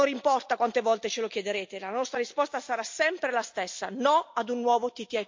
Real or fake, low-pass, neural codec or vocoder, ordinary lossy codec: real; 7.2 kHz; none; MP3, 48 kbps